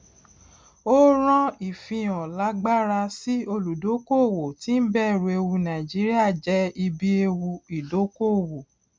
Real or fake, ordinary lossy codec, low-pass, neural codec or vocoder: real; none; none; none